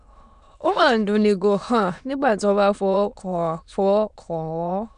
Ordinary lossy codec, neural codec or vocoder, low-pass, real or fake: none; autoencoder, 22.05 kHz, a latent of 192 numbers a frame, VITS, trained on many speakers; 9.9 kHz; fake